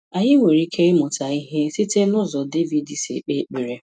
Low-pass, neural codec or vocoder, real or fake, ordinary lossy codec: 9.9 kHz; none; real; none